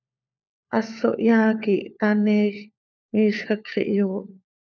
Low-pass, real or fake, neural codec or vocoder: 7.2 kHz; fake; codec, 16 kHz, 4 kbps, FunCodec, trained on LibriTTS, 50 frames a second